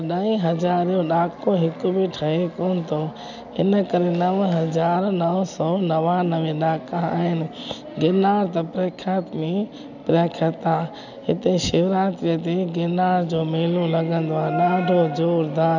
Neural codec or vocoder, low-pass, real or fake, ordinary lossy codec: none; 7.2 kHz; real; none